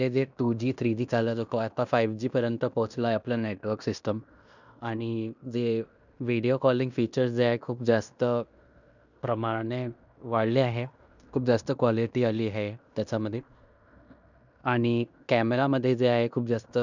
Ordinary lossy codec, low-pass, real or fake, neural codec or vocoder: none; 7.2 kHz; fake; codec, 16 kHz in and 24 kHz out, 0.9 kbps, LongCat-Audio-Codec, fine tuned four codebook decoder